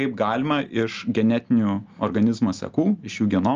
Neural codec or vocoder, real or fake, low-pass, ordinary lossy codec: none; real; 7.2 kHz; Opus, 24 kbps